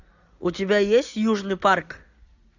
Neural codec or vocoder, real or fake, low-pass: none; real; 7.2 kHz